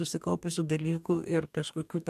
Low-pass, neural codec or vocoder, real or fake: 14.4 kHz; codec, 44.1 kHz, 2.6 kbps, DAC; fake